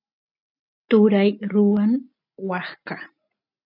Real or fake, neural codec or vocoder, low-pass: real; none; 5.4 kHz